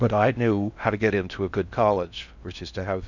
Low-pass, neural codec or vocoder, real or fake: 7.2 kHz; codec, 16 kHz in and 24 kHz out, 0.6 kbps, FocalCodec, streaming, 4096 codes; fake